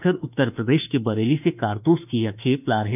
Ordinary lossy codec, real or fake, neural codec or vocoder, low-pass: none; fake; autoencoder, 48 kHz, 32 numbers a frame, DAC-VAE, trained on Japanese speech; 3.6 kHz